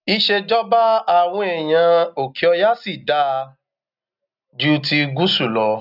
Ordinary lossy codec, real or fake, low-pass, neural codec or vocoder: none; real; 5.4 kHz; none